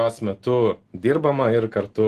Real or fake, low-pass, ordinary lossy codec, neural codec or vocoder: real; 14.4 kHz; Opus, 16 kbps; none